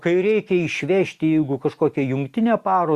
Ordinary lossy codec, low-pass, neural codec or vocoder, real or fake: Opus, 64 kbps; 14.4 kHz; none; real